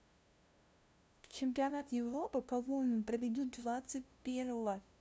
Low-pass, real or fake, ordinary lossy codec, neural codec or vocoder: none; fake; none; codec, 16 kHz, 0.5 kbps, FunCodec, trained on LibriTTS, 25 frames a second